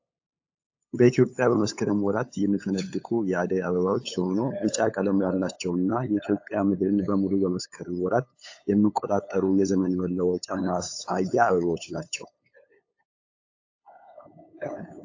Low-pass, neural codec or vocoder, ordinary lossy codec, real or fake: 7.2 kHz; codec, 16 kHz, 8 kbps, FunCodec, trained on LibriTTS, 25 frames a second; AAC, 48 kbps; fake